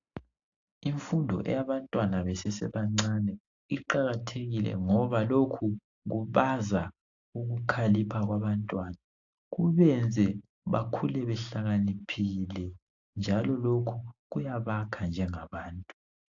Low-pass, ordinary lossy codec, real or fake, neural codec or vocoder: 7.2 kHz; AAC, 48 kbps; real; none